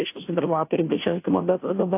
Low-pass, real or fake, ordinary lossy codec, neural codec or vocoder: 3.6 kHz; fake; AAC, 24 kbps; codec, 16 kHz, 0.5 kbps, FunCodec, trained on Chinese and English, 25 frames a second